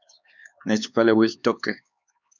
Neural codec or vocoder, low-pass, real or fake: codec, 16 kHz, 4 kbps, X-Codec, HuBERT features, trained on LibriSpeech; 7.2 kHz; fake